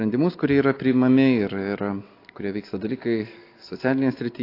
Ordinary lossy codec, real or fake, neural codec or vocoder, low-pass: AAC, 32 kbps; real; none; 5.4 kHz